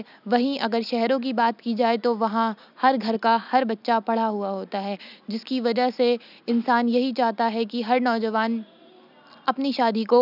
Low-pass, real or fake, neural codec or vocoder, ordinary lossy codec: 5.4 kHz; real; none; none